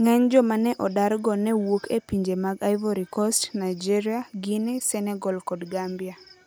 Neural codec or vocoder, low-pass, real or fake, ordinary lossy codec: none; none; real; none